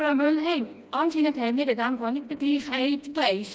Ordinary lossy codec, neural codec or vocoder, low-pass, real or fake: none; codec, 16 kHz, 1 kbps, FreqCodec, smaller model; none; fake